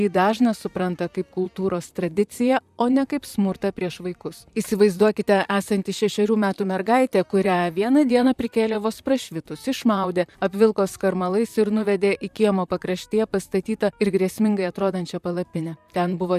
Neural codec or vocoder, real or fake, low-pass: vocoder, 44.1 kHz, 128 mel bands, Pupu-Vocoder; fake; 14.4 kHz